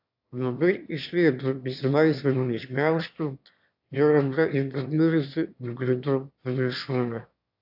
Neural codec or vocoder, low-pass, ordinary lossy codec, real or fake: autoencoder, 22.05 kHz, a latent of 192 numbers a frame, VITS, trained on one speaker; 5.4 kHz; AAC, 48 kbps; fake